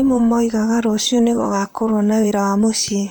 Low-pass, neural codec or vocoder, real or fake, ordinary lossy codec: none; vocoder, 44.1 kHz, 128 mel bands, Pupu-Vocoder; fake; none